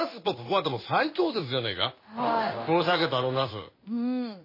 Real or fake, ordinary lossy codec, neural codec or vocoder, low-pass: fake; MP3, 24 kbps; codec, 16 kHz in and 24 kHz out, 1 kbps, XY-Tokenizer; 5.4 kHz